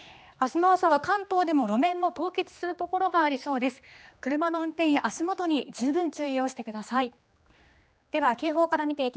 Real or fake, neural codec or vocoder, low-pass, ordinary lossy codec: fake; codec, 16 kHz, 2 kbps, X-Codec, HuBERT features, trained on general audio; none; none